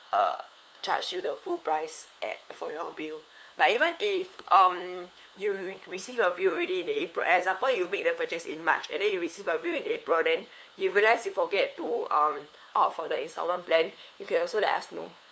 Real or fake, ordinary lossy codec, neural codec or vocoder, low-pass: fake; none; codec, 16 kHz, 2 kbps, FunCodec, trained on LibriTTS, 25 frames a second; none